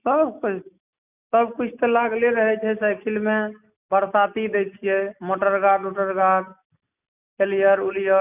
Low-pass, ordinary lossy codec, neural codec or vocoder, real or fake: 3.6 kHz; none; none; real